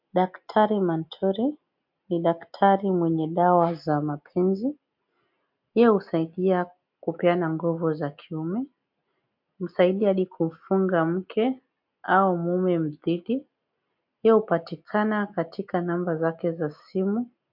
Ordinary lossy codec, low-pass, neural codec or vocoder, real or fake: MP3, 48 kbps; 5.4 kHz; none; real